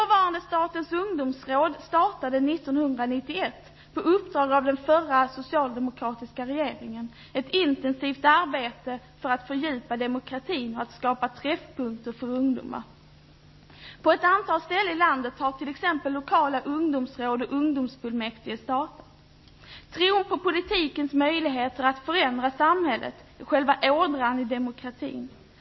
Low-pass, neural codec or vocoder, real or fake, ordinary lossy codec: 7.2 kHz; none; real; MP3, 24 kbps